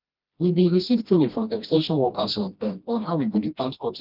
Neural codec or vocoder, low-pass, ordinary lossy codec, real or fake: codec, 16 kHz, 1 kbps, FreqCodec, smaller model; 5.4 kHz; Opus, 32 kbps; fake